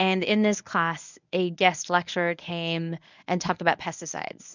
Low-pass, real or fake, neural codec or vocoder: 7.2 kHz; fake; codec, 24 kHz, 0.9 kbps, WavTokenizer, medium speech release version 1